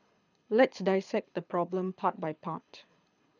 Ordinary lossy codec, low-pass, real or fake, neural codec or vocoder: none; 7.2 kHz; fake; codec, 24 kHz, 6 kbps, HILCodec